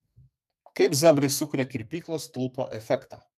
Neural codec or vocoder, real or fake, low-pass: codec, 44.1 kHz, 2.6 kbps, SNAC; fake; 14.4 kHz